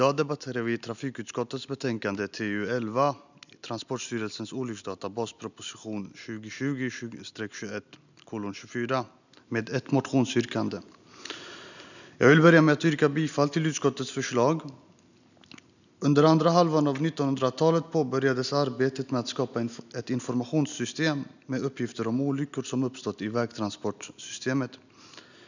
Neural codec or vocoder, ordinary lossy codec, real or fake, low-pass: none; none; real; 7.2 kHz